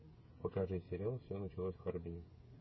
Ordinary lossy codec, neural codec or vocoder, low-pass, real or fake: MP3, 24 kbps; codec, 16 kHz, 16 kbps, FreqCodec, smaller model; 7.2 kHz; fake